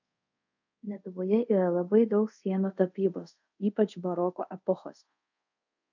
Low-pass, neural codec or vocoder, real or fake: 7.2 kHz; codec, 24 kHz, 0.5 kbps, DualCodec; fake